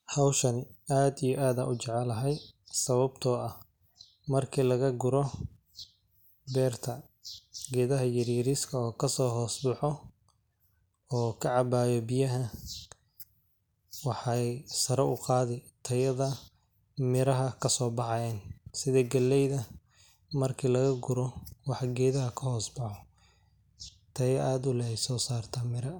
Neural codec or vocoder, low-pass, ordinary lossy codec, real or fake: none; none; none; real